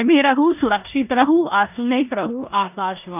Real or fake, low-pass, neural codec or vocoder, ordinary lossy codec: fake; 3.6 kHz; codec, 16 kHz in and 24 kHz out, 0.9 kbps, LongCat-Audio-Codec, four codebook decoder; none